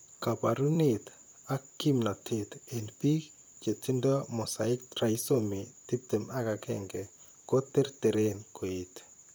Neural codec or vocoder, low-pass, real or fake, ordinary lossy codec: vocoder, 44.1 kHz, 128 mel bands, Pupu-Vocoder; none; fake; none